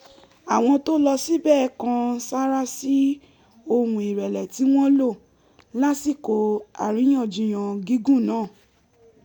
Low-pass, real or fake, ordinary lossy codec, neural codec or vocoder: 19.8 kHz; real; none; none